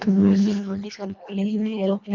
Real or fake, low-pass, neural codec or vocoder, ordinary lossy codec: fake; 7.2 kHz; codec, 24 kHz, 1.5 kbps, HILCodec; none